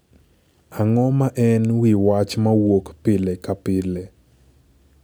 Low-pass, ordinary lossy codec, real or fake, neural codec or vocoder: none; none; real; none